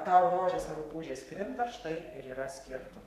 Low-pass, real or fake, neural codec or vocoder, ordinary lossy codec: 14.4 kHz; fake; codec, 32 kHz, 1.9 kbps, SNAC; MP3, 96 kbps